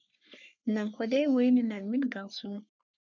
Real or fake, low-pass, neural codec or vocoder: fake; 7.2 kHz; codec, 44.1 kHz, 3.4 kbps, Pupu-Codec